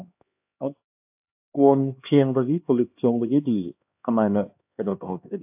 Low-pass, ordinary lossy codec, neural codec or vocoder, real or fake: 3.6 kHz; AAC, 32 kbps; codec, 16 kHz, 2 kbps, X-Codec, HuBERT features, trained on LibriSpeech; fake